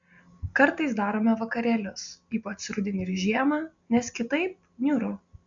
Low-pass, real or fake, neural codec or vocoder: 7.2 kHz; real; none